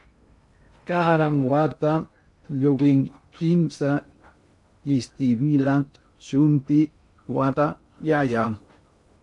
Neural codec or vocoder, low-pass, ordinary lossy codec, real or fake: codec, 16 kHz in and 24 kHz out, 0.6 kbps, FocalCodec, streaming, 2048 codes; 10.8 kHz; MP3, 64 kbps; fake